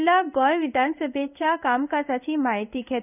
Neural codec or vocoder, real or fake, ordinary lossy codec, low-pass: codec, 16 kHz in and 24 kHz out, 1 kbps, XY-Tokenizer; fake; none; 3.6 kHz